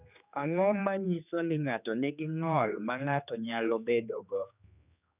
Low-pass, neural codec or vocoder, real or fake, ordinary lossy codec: 3.6 kHz; codec, 16 kHz, 2 kbps, X-Codec, HuBERT features, trained on general audio; fake; none